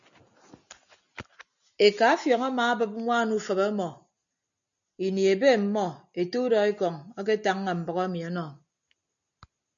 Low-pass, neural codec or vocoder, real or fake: 7.2 kHz; none; real